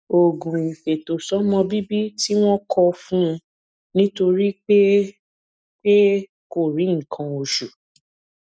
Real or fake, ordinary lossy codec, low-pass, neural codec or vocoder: real; none; none; none